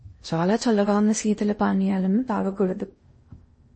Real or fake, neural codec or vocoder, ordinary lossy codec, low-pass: fake; codec, 16 kHz in and 24 kHz out, 0.8 kbps, FocalCodec, streaming, 65536 codes; MP3, 32 kbps; 9.9 kHz